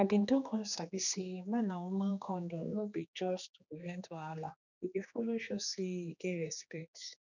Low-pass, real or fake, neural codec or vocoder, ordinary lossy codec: 7.2 kHz; fake; codec, 16 kHz, 2 kbps, X-Codec, HuBERT features, trained on general audio; none